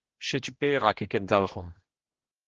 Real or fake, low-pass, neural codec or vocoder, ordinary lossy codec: fake; 7.2 kHz; codec, 16 kHz, 1 kbps, X-Codec, HuBERT features, trained on general audio; Opus, 16 kbps